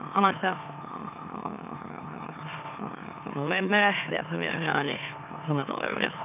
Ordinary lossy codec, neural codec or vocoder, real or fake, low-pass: none; autoencoder, 44.1 kHz, a latent of 192 numbers a frame, MeloTTS; fake; 3.6 kHz